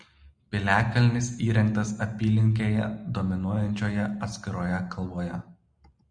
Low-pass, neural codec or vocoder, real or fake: 9.9 kHz; none; real